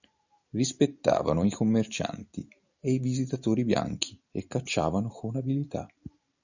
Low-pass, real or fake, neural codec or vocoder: 7.2 kHz; real; none